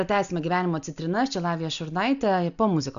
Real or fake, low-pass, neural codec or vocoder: real; 7.2 kHz; none